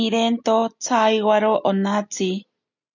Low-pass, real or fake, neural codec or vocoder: 7.2 kHz; real; none